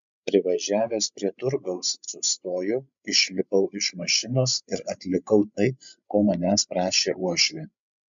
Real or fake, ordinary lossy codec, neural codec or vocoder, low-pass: fake; MP3, 64 kbps; codec, 16 kHz, 16 kbps, FreqCodec, larger model; 7.2 kHz